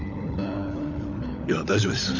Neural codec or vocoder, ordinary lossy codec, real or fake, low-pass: codec, 16 kHz, 16 kbps, FunCodec, trained on LibriTTS, 50 frames a second; none; fake; 7.2 kHz